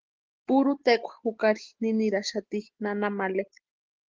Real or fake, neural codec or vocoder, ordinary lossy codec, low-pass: real; none; Opus, 16 kbps; 7.2 kHz